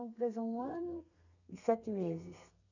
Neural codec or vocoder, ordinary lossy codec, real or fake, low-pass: codec, 32 kHz, 1.9 kbps, SNAC; none; fake; 7.2 kHz